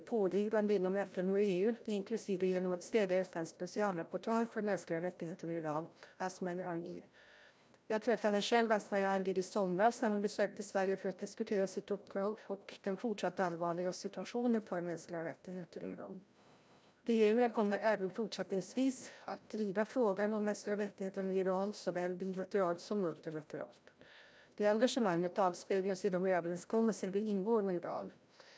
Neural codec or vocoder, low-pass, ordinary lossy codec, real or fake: codec, 16 kHz, 0.5 kbps, FreqCodec, larger model; none; none; fake